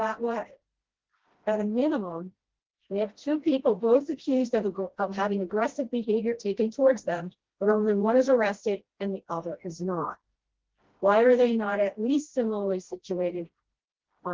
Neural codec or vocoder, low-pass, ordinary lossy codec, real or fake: codec, 16 kHz, 1 kbps, FreqCodec, smaller model; 7.2 kHz; Opus, 16 kbps; fake